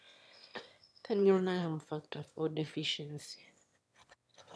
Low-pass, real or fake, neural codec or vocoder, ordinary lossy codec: none; fake; autoencoder, 22.05 kHz, a latent of 192 numbers a frame, VITS, trained on one speaker; none